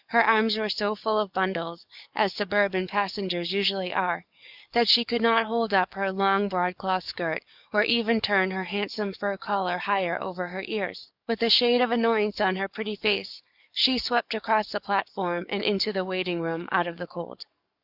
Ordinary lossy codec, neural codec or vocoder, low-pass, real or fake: Opus, 64 kbps; codec, 44.1 kHz, 7.8 kbps, DAC; 5.4 kHz; fake